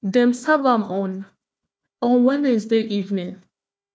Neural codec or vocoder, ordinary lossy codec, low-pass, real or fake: codec, 16 kHz, 1 kbps, FunCodec, trained on Chinese and English, 50 frames a second; none; none; fake